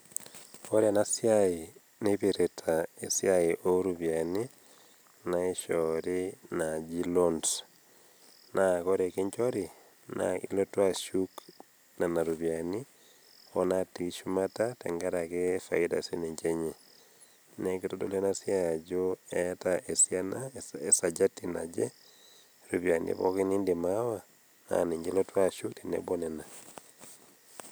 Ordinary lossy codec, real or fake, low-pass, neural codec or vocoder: none; real; none; none